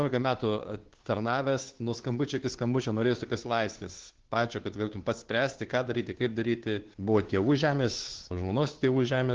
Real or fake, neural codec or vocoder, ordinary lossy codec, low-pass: fake; codec, 16 kHz, 2 kbps, FunCodec, trained on Chinese and English, 25 frames a second; Opus, 16 kbps; 7.2 kHz